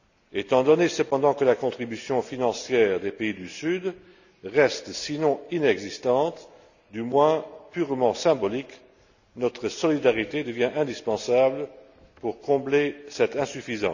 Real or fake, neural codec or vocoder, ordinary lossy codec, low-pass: real; none; none; 7.2 kHz